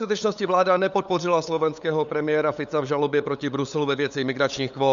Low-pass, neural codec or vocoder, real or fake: 7.2 kHz; codec, 16 kHz, 8 kbps, FunCodec, trained on LibriTTS, 25 frames a second; fake